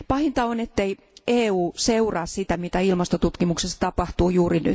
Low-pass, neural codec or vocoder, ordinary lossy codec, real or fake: none; none; none; real